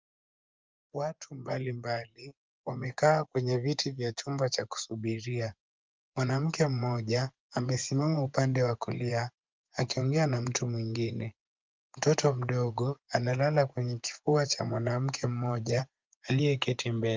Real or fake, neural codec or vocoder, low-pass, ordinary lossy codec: fake; vocoder, 24 kHz, 100 mel bands, Vocos; 7.2 kHz; Opus, 24 kbps